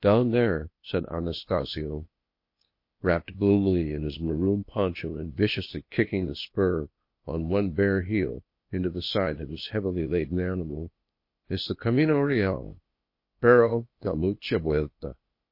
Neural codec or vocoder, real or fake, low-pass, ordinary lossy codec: codec, 16 kHz, 0.8 kbps, ZipCodec; fake; 5.4 kHz; MP3, 32 kbps